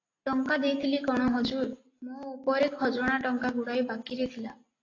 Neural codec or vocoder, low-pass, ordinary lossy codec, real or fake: none; 7.2 kHz; AAC, 32 kbps; real